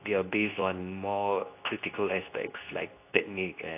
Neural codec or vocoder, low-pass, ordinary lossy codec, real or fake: codec, 24 kHz, 0.9 kbps, WavTokenizer, medium speech release version 2; 3.6 kHz; AAC, 24 kbps; fake